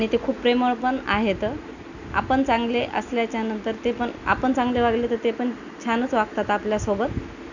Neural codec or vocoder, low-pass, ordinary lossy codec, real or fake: none; 7.2 kHz; none; real